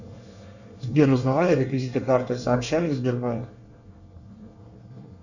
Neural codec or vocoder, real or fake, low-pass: codec, 24 kHz, 1 kbps, SNAC; fake; 7.2 kHz